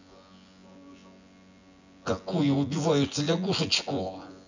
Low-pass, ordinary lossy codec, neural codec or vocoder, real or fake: 7.2 kHz; none; vocoder, 24 kHz, 100 mel bands, Vocos; fake